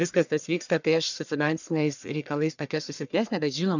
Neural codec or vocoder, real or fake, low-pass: codec, 44.1 kHz, 1.7 kbps, Pupu-Codec; fake; 7.2 kHz